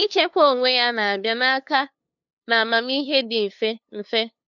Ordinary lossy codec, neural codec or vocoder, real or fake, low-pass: none; codec, 16 kHz, 2 kbps, FunCodec, trained on LibriTTS, 25 frames a second; fake; 7.2 kHz